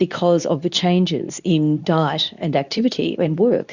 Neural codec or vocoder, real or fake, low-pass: codec, 24 kHz, 0.9 kbps, WavTokenizer, medium speech release version 2; fake; 7.2 kHz